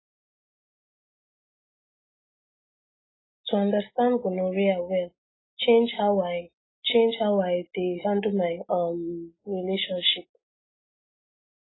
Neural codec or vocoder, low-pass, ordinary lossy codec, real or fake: none; 7.2 kHz; AAC, 16 kbps; real